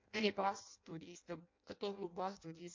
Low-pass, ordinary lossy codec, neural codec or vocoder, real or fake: 7.2 kHz; MP3, 48 kbps; codec, 16 kHz in and 24 kHz out, 0.6 kbps, FireRedTTS-2 codec; fake